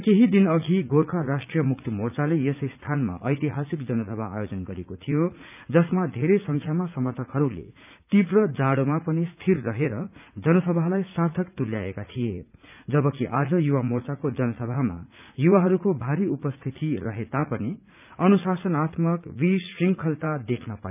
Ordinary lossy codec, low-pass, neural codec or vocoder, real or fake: none; 3.6 kHz; vocoder, 44.1 kHz, 80 mel bands, Vocos; fake